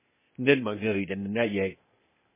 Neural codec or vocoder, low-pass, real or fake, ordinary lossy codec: codec, 16 kHz, 0.8 kbps, ZipCodec; 3.6 kHz; fake; MP3, 16 kbps